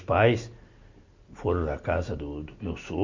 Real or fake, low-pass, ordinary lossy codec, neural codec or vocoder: real; 7.2 kHz; MP3, 64 kbps; none